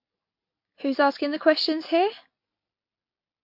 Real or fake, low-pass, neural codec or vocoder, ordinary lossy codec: real; 5.4 kHz; none; MP3, 32 kbps